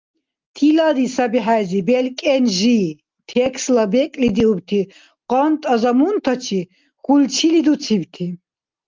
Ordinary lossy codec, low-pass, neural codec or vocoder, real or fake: Opus, 32 kbps; 7.2 kHz; none; real